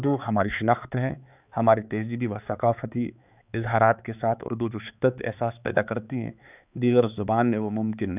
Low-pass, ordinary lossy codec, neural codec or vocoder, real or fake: 3.6 kHz; none; codec, 16 kHz, 4 kbps, X-Codec, HuBERT features, trained on balanced general audio; fake